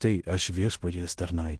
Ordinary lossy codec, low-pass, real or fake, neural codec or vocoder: Opus, 16 kbps; 10.8 kHz; fake; codec, 16 kHz in and 24 kHz out, 0.9 kbps, LongCat-Audio-Codec, fine tuned four codebook decoder